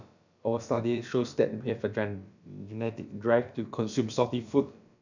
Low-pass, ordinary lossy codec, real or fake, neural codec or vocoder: 7.2 kHz; none; fake; codec, 16 kHz, about 1 kbps, DyCAST, with the encoder's durations